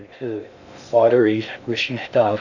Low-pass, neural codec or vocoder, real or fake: 7.2 kHz; codec, 16 kHz in and 24 kHz out, 0.6 kbps, FocalCodec, streaming, 2048 codes; fake